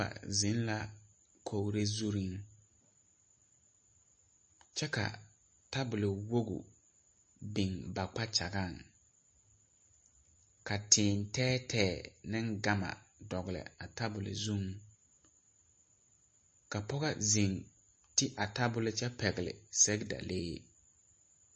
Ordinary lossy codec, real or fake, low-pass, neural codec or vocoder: MP3, 32 kbps; real; 10.8 kHz; none